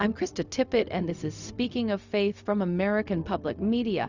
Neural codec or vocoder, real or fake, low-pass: codec, 16 kHz, 0.4 kbps, LongCat-Audio-Codec; fake; 7.2 kHz